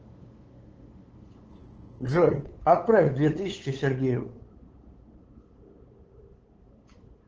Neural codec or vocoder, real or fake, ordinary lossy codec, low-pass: codec, 16 kHz, 8 kbps, FunCodec, trained on LibriTTS, 25 frames a second; fake; Opus, 16 kbps; 7.2 kHz